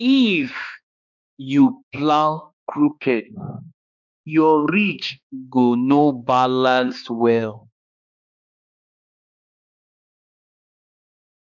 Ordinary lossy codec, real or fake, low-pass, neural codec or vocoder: none; fake; 7.2 kHz; codec, 16 kHz, 2 kbps, X-Codec, HuBERT features, trained on balanced general audio